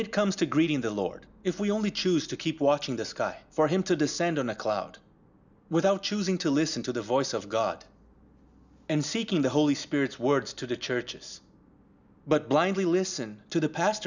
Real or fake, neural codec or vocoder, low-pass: real; none; 7.2 kHz